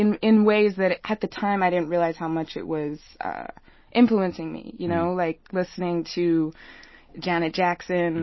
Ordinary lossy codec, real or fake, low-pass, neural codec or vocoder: MP3, 24 kbps; real; 7.2 kHz; none